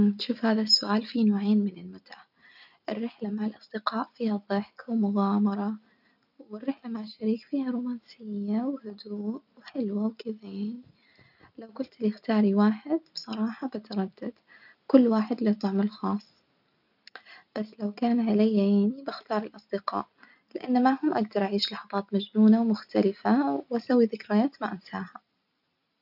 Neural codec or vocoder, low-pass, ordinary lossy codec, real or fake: none; 5.4 kHz; none; real